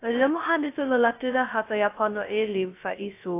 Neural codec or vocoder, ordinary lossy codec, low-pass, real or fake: codec, 16 kHz, 0.2 kbps, FocalCodec; AAC, 24 kbps; 3.6 kHz; fake